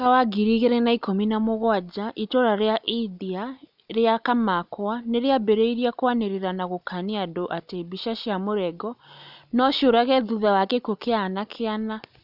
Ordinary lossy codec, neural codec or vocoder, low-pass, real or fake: none; none; 5.4 kHz; real